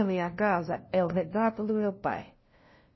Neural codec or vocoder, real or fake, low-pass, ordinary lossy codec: codec, 16 kHz, 0.5 kbps, FunCodec, trained on LibriTTS, 25 frames a second; fake; 7.2 kHz; MP3, 24 kbps